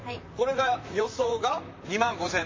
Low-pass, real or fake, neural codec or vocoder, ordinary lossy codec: 7.2 kHz; fake; vocoder, 44.1 kHz, 128 mel bands, Pupu-Vocoder; MP3, 32 kbps